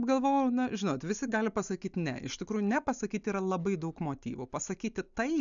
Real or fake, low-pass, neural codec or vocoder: real; 7.2 kHz; none